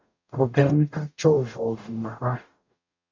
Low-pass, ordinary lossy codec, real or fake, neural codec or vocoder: 7.2 kHz; MP3, 64 kbps; fake; codec, 44.1 kHz, 0.9 kbps, DAC